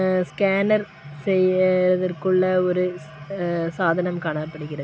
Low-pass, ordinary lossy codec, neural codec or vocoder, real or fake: none; none; none; real